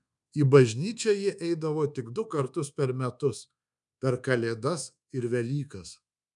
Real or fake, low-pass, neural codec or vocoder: fake; 10.8 kHz; codec, 24 kHz, 1.2 kbps, DualCodec